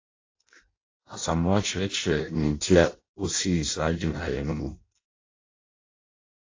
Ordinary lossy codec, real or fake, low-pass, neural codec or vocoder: AAC, 32 kbps; fake; 7.2 kHz; codec, 16 kHz in and 24 kHz out, 0.6 kbps, FireRedTTS-2 codec